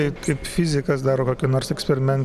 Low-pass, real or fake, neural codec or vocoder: 14.4 kHz; real; none